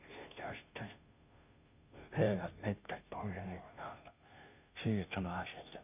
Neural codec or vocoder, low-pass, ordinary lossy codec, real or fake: codec, 16 kHz, 0.5 kbps, FunCodec, trained on Chinese and English, 25 frames a second; 3.6 kHz; none; fake